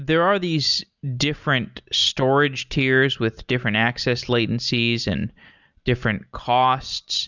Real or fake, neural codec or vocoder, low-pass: real; none; 7.2 kHz